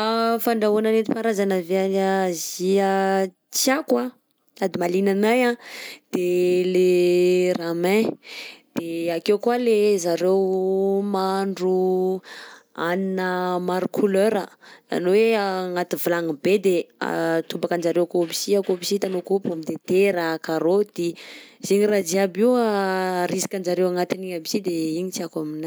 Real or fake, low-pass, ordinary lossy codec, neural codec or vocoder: real; none; none; none